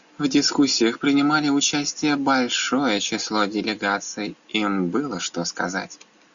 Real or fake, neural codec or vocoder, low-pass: real; none; 7.2 kHz